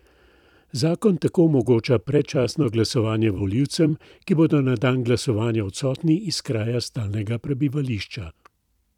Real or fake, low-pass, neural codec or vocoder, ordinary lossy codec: fake; 19.8 kHz; vocoder, 44.1 kHz, 128 mel bands every 256 samples, BigVGAN v2; none